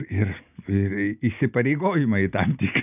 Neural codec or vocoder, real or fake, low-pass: none; real; 3.6 kHz